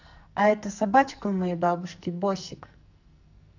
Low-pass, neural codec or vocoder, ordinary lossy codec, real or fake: 7.2 kHz; codec, 44.1 kHz, 2.6 kbps, SNAC; none; fake